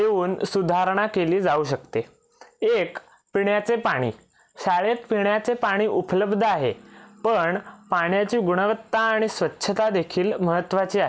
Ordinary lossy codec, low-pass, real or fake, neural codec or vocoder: none; none; real; none